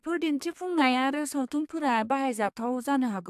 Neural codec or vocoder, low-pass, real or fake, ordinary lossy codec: codec, 32 kHz, 1.9 kbps, SNAC; 14.4 kHz; fake; AAC, 96 kbps